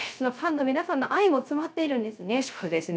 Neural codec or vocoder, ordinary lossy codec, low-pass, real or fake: codec, 16 kHz, 0.3 kbps, FocalCodec; none; none; fake